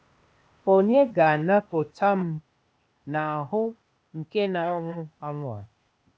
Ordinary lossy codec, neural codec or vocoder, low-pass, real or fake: none; codec, 16 kHz, 0.8 kbps, ZipCodec; none; fake